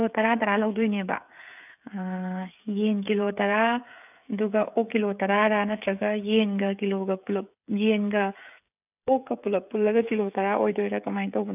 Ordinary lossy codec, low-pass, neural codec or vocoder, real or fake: none; 3.6 kHz; codec, 16 kHz, 16 kbps, FreqCodec, smaller model; fake